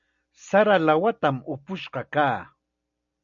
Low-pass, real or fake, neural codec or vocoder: 7.2 kHz; real; none